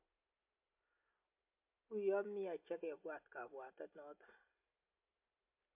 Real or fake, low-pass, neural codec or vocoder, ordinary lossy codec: real; 3.6 kHz; none; MP3, 32 kbps